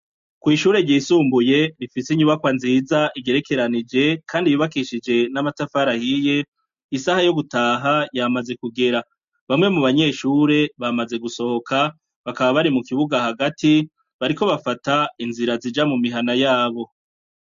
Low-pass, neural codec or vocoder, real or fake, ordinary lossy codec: 7.2 kHz; none; real; MP3, 48 kbps